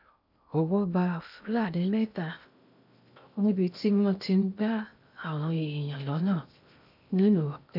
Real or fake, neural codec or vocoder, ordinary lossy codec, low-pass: fake; codec, 16 kHz in and 24 kHz out, 0.6 kbps, FocalCodec, streaming, 2048 codes; none; 5.4 kHz